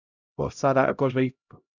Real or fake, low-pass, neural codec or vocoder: fake; 7.2 kHz; codec, 16 kHz, 0.5 kbps, X-Codec, HuBERT features, trained on LibriSpeech